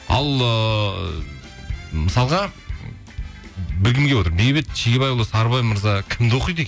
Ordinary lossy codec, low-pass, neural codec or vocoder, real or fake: none; none; none; real